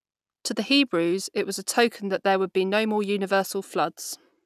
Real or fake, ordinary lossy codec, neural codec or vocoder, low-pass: real; none; none; 14.4 kHz